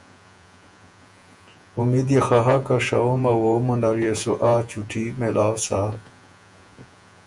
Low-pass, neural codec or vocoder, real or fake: 10.8 kHz; vocoder, 48 kHz, 128 mel bands, Vocos; fake